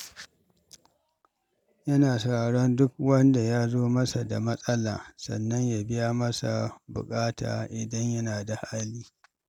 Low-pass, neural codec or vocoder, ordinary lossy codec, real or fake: 19.8 kHz; none; none; real